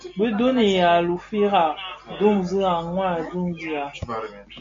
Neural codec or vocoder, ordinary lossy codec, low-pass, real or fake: none; AAC, 48 kbps; 7.2 kHz; real